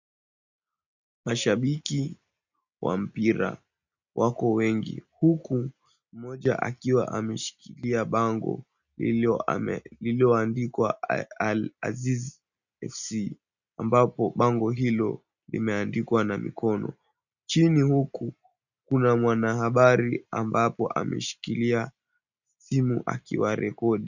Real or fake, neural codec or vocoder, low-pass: real; none; 7.2 kHz